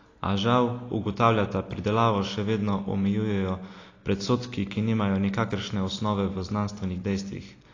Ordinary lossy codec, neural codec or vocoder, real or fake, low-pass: AAC, 32 kbps; none; real; 7.2 kHz